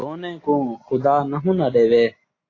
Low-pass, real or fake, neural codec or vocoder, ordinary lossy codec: 7.2 kHz; real; none; AAC, 32 kbps